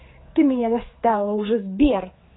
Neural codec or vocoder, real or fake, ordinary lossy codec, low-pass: codec, 16 kHz, 4 kbps, X-Codec, HuBERT features, trained on general audio; fake; AAC, 16 kbps; 7.2 kHz